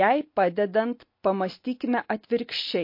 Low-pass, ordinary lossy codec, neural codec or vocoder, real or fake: 5.4 kHz; MP3, 32 kbps; none; real